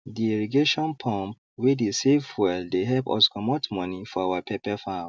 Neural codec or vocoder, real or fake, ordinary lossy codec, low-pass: none; real; none; none